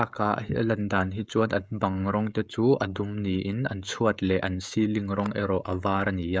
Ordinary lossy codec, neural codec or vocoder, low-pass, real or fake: none; codec, 16 kHz, 16 kbps, FreqCodec, smaller model; none; fake